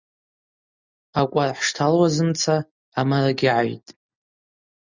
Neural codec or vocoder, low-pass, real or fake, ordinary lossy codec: none; 7.2 kHz; real; Opus, 64 kbps